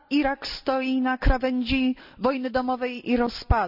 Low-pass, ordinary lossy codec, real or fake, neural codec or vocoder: 5.4 kHz; none; real; none